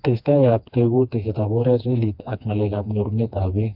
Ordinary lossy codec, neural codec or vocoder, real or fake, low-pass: AAC, 48 kbps; codec, 16 kHz, 2 kbps, FreqCodec, smaller model; fake; 5.4 kHz